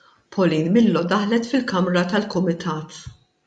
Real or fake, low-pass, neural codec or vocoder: real; 9.9 kHz; none